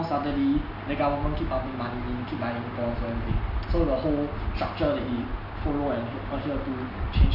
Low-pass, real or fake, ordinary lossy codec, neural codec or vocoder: 5.4 kHz; real; none; none